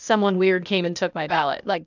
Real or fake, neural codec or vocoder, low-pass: fake; codec, 16 kHz, 0.8 kbps, ZipCodec; 7.2 kHz